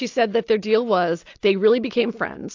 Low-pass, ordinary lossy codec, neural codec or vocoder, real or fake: 7.2 kHz; AAC, 48 kbps; vocoder, 44.1 kHz, 128 mel bands every 256 samples, BigVGAN v2; fake